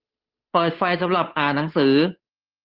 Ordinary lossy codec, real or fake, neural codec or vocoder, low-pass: Opus, 32 kbps; fake; codec, 16 kHz, 8 kbps, FunCodec, trained on Chinese and English, 25 frames a second; 5.4 kHz